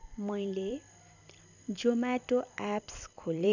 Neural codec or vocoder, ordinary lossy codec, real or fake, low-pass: vocoder, 44.1 kHz, 128 mel bands every 256 samples, BigVGAN v2; none; fake; 7.2 kHz